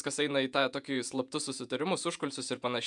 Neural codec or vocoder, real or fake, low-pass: vocoder, 48 kHz, 128 mel bands, Vocos; fake; 10.8 kHz